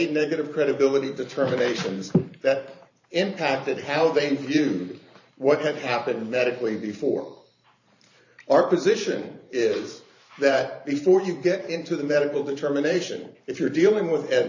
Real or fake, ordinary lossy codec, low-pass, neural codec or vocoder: fake; AAC, 48 kbps; 7.2 kHz; vocoder, 44.1 kHz, 128 mel bands every 512 samples, BigVGAN v2